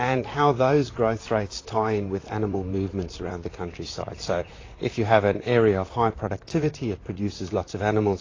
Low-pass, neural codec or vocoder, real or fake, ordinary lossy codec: 7.2 kHz; vocoder, 44.1 kHz, 128 mel bands, Pupu-Vocoder; fake; AAC, 32 kbps